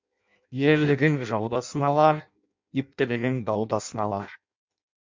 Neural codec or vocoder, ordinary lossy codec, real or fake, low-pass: codec, 16 kHz in and 24 kHz out, 0.6 kbps, FireRedTTS-2 codec; MP3, 64 kbps; fake; 7.2 kHz